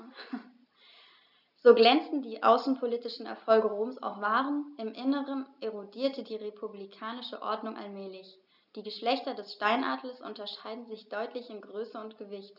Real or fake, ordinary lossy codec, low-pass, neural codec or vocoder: real; none; 5.4 kHz; none